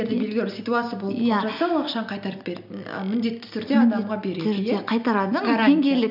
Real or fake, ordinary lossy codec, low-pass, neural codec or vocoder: real; none; 5.4 kHz; none